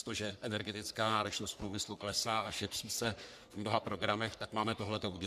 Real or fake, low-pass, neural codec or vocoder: fake; 14.4 kHz; codec, 44.1 kHz, 3.4 kbps, Pupu-Codec